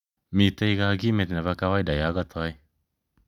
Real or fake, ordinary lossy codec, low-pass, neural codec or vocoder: real; none; 19.8 kHz; none